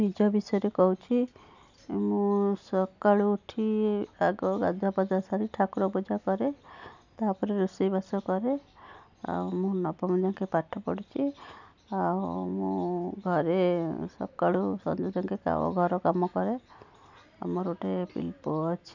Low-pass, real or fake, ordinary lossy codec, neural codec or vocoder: 7.2 kHz; real; none; none